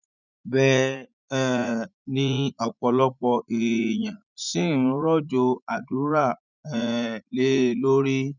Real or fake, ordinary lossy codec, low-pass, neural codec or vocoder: fake; none; 7.2 kHz; vocoder, 44.1 kHz, 80 mel bands, Vocos